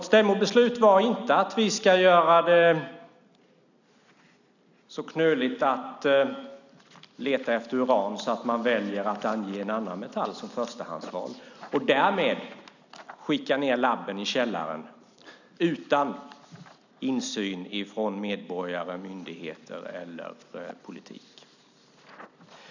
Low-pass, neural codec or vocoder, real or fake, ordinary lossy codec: 7.2 kHz; none; real; none